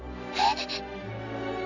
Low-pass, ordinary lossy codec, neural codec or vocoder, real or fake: 7.2 kHz; none; none; real